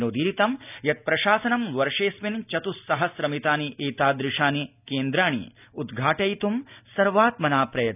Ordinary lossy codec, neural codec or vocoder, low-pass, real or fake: none; none; 3.6 kHz; real